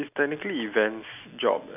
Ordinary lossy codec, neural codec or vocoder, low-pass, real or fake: none; none; 3.6 kHz; real